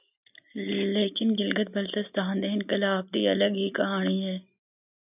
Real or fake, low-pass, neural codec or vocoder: real; 3.6 kHz; none